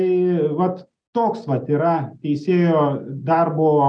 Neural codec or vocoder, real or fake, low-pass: none; real; 9.9 kHz